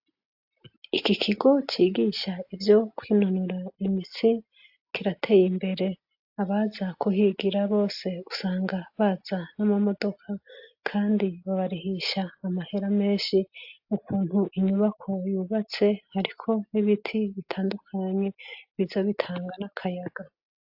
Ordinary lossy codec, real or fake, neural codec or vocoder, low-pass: AAC, 48 kbps; real; none; 5.4 kHz